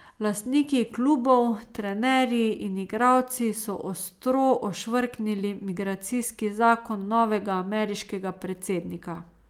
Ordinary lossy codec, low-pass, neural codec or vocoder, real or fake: Opus, 32 kbps; 19.8 kHz; none; real